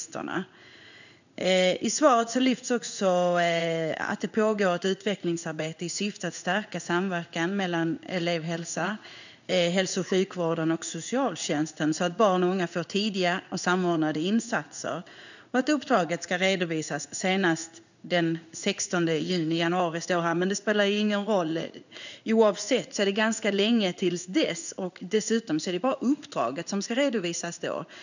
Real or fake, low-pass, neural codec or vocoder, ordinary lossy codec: fake; 7.2 kHz; codec, 16 kHz in and 24 kHz out, 1 kbps, XY-Tokenizer; none